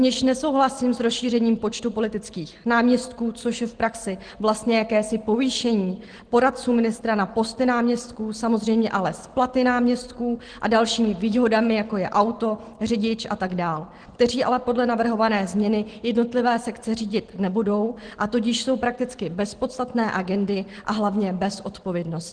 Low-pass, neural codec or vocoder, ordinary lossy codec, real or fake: 9.9 kHz; none; Opus, 16 kbps; real